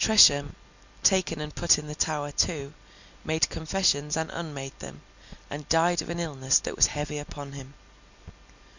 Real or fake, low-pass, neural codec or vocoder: real; 7.2 kHz; none